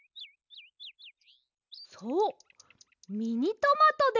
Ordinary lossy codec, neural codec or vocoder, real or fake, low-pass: none; none; real; 7.2 kHz